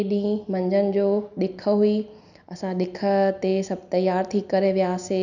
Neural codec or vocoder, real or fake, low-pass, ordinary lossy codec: none; real; 7.2 kHz; none